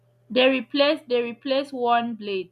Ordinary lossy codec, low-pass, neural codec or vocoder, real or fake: none; 14.4 kHz; none; real